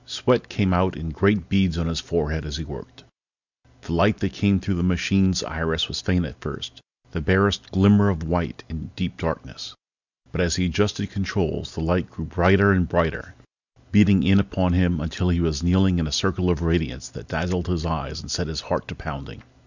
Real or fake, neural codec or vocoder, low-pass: real; none; 7.2 kHz